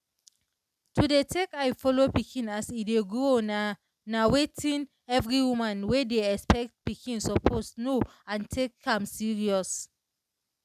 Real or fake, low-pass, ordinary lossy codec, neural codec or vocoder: real; 14.4 kHz; none; none